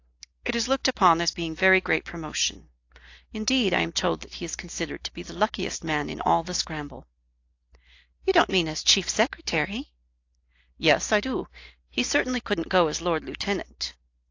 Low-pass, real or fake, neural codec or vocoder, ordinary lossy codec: 7.2 kHz; real; none; AAC, 48 kbps